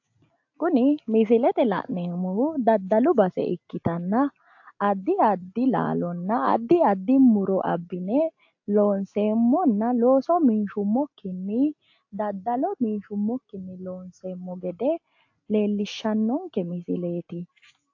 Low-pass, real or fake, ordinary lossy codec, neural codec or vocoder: 7.2 kHz; real; AAC, 48 kbps; none